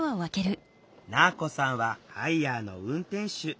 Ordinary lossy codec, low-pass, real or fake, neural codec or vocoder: none; none; real; none